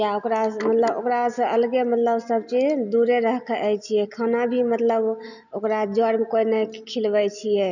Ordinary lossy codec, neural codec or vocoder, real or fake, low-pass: none; none; real; 7.2 kHz